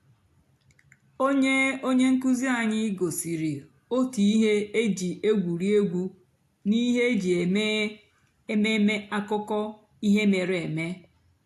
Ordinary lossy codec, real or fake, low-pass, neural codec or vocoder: AAC, 64 kbps; real; 14.4 kHz; none